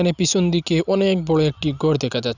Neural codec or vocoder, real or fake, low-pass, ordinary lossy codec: none; real; 7.2 kHz; none